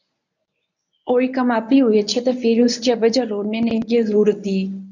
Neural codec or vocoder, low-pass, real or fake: codec, 24 kHz, 0.9 kbps, WavTokenizer, medium speech release version 1; 7.2 kHz; fake